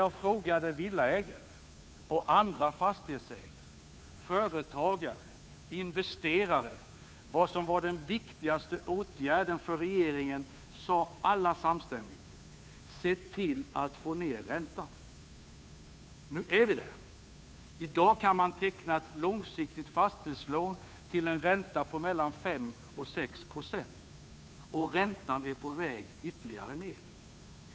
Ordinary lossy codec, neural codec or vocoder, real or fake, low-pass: none; codec, 16 kHz, 2 kbps, FunCodec, trained on Chinese and English, 25 frames a second; fake; none